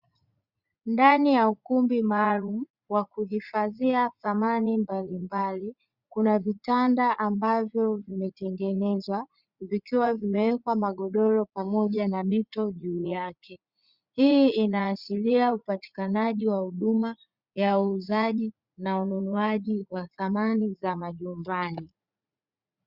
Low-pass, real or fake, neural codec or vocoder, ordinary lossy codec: 5.4 kHz; fake; vocoder, 44.1 kHz, 80 mel bands, Vocos; Opus, 64 kbps